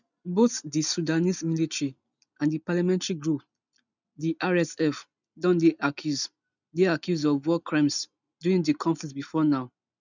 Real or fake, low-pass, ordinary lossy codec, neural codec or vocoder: real; 7.2 kHz; none; none